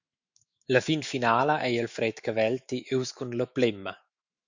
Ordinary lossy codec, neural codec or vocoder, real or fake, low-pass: Opus, 64 kbps; autoencoder, 48 kHz, 128 numbers a frame, DAC-VAE, trained on Japanese speech; fake; 7.2 kHz